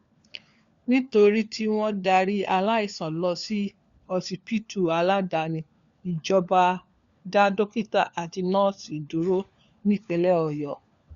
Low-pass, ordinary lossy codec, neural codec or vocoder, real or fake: 7.2 kHz; Opus, 64 kbps; codec, 16 kHz, 4 kbps, FunCodec, trained on LibriTTS, 50 frames a second; fake